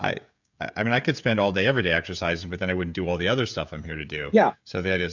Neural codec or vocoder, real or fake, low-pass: codec, 16 kHz, 16 kbps, FreqCodec, smaller model; fake; 7.2 kHz